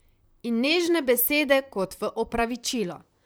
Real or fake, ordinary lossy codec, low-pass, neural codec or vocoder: fake; none; none; vocoder, 44.1 kHz, 128 mel bands, Pupu-Vocoder